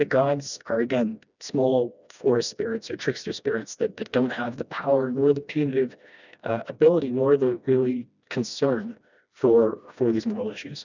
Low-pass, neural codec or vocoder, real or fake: 7.2 kHz; codec, 16 kHz, 1 kbps, FreqCodec, smaller model; fake